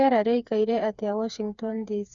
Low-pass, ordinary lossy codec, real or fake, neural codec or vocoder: 7.2 kHz; Opus, 64 kbps; fake; codec, 16 kHz, 4 kbps, FreqCodec, smaller model